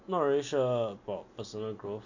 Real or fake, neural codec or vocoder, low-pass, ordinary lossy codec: real; none; 7.2 kHz; none